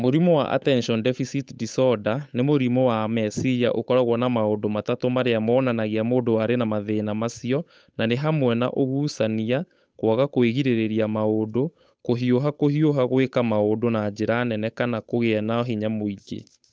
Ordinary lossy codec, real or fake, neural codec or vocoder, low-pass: none; fake; codec, 16 kHz, 8 kbps, FunCodec, trained on Chinese and English, 25 frames a second; none